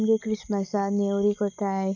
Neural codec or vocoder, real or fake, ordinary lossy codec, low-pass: none; real; none; 7.2 kHz